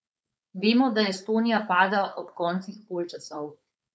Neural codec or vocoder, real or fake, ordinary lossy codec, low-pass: codec, 16 kHz, 4.8 kbps, FACodec; fake; none; none